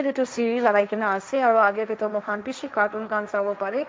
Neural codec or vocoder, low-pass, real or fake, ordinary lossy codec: codec, 16 kHz, 1.1 kbps, Voila-Tokenizer; none; fake; none